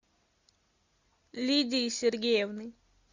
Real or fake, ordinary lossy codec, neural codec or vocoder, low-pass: real; Opus, 64 kbps; none; 7.2 kHz